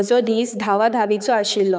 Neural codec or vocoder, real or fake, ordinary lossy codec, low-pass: codec, 16 kHz, 4 kbps, X-Codec, HuBERT features, trained on balanced general audio; fake; none; none